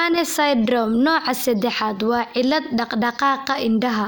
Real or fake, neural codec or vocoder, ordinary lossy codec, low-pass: real; none; none; none